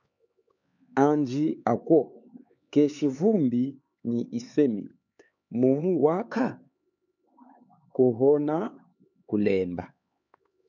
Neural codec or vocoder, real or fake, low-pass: codec, 16 kHz, 4 kbps, X-Codec, HuBERT features, trained on LibriSpeech; fake; 7.2 kHz